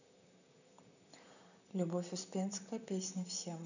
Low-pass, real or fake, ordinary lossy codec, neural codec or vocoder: 7.2 kHz; fake; none; vocoder, 44.1 kHz, 128 mel bands, Pupu-Vocoder